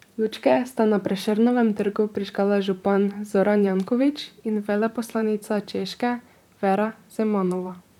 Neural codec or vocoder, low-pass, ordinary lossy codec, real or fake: vocoder, 44.1 kHz, 128 mel bands, Pupu-Vocoder; 19.8 kHz; none; fake